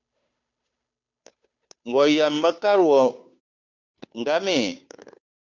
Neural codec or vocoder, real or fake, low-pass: codec, 16 kHz, 2 kbps, FunCodec, trained on Chinese and English, 25 frames a second; fake; 7.2 kHz